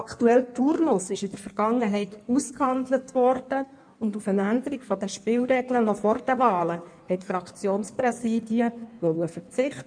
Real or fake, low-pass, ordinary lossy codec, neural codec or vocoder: fake; 9.9 kHz; none; codec, 16 kHz in and 24 kHz out, 1.1 kbps, FireRedTTS-2 codec